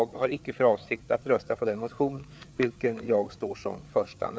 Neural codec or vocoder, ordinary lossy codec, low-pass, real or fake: codec, 16 kHz, 4 kbps, FreqCodec, larger model; none; none; fake